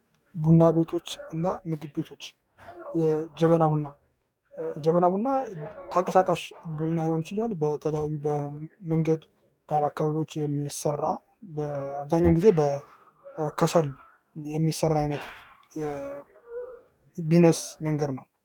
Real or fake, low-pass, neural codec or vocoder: fake; 19.8 kHz; codec, 44.1 kHz, 2.6 kbps, DAC